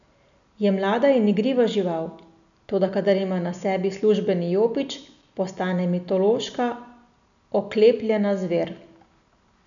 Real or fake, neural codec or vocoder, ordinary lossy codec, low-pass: real; none; none; 7.2 kHz